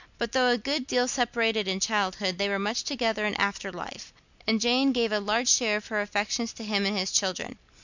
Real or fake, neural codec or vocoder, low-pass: real; none; 7.2 kHz